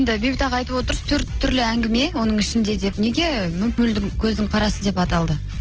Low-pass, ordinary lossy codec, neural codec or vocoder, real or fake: 7.2 kHz; Opus, 16 kbps; none; real